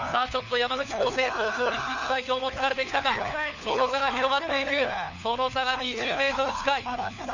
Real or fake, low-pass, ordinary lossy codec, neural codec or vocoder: fake; 7.2 kHz; none; codec, 16 kHz, 4 kbps, FunCodec, trained on LibriTTS, 50 frames a second